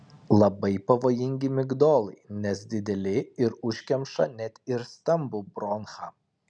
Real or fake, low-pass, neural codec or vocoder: real; 9.9 kHz; none